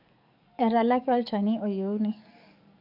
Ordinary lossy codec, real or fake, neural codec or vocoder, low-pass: none; fake; codec, 44.1 kHz, 7.8 kbps, DAC; 5.4 kHz